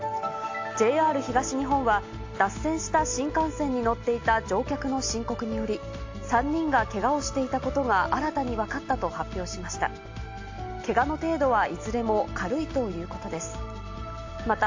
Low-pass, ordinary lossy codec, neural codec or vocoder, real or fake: 7.2 kHz; AAC, 48 kbps; none; real